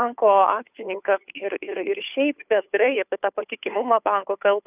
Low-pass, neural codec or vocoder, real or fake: 3.6 kHz; codec, 16 kHz, 2 kbps, FunCodec, trained on Chinese and English, 25 frames a second; fake